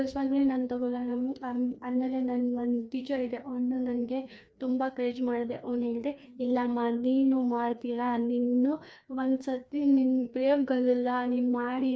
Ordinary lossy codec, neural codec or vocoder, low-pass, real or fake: none; codec, 16 kHz, 2 kbps, FreqCodec, larger model; none; fake